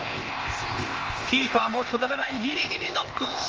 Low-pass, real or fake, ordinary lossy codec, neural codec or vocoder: 7.2 kHz; fake; Opus, 24 kbps; codec, 16 kHz, 0.8 kbps, ZipCodec